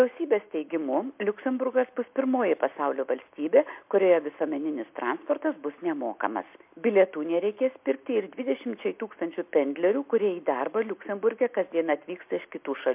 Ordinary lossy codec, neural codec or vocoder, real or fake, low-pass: MP3, 32 kbps; none; real; 3.6 kHz